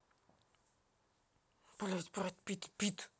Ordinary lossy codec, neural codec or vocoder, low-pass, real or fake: none; none; none; real